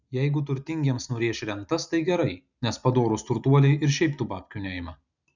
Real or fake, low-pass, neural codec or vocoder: real; 7.2 kHz; none